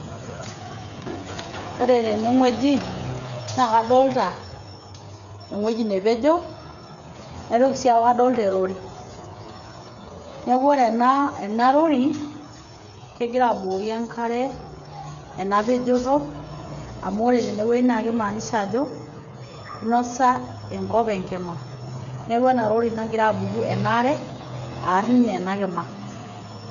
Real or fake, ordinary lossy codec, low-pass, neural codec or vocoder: fake; none; 7.2 kHz; codec, 16 kHz, 8 kbps, FreqCodec, smaller model